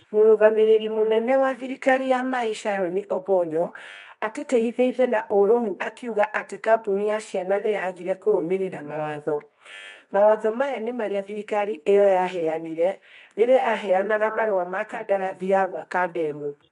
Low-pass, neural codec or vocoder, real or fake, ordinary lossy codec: 10.8 kHz; codec, 24 kHz, 0.9 kbps, WavTokenizer, medium music audio release; fake; MP3, 64 kbps